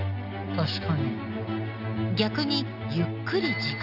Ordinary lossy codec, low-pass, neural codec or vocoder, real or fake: none; 5.4 kHz; none; real